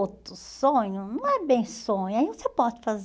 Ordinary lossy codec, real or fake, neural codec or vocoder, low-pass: none; real; none; none